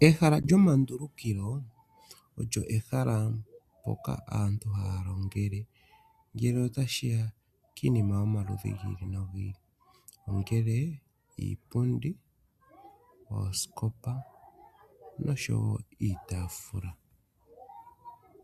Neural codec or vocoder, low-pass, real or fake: none; 14.4 kHz; real